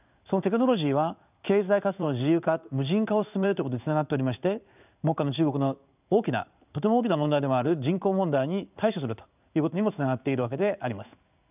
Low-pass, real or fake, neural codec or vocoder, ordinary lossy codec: 3.6 kHz; fake; codec, 16 kHz in and 24 kHz out, 1 kbps, XY-Tokenizer; none